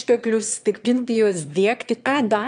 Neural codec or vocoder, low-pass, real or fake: autoencoder, 22.05 kHz, a latent of 192 numbers a frame, VITS, trained on one speaker; 9.9 kHz; fake